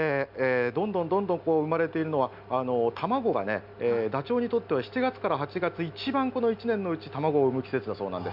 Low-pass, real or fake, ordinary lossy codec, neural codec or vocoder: 5.4 kHz; real; none; none